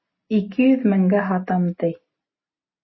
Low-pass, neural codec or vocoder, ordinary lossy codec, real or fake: 7.2 kHz; none; MP3, 24 kbps; real